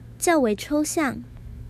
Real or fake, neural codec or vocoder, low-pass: fake; autoencoder, 48 kHz, 128 numbers a frame, DAC-VAE, trained on Japanese speech; 14.4 kHz